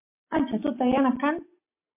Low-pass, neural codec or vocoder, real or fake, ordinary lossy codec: 3.6 kHz; none; real; MP3, 24 kbps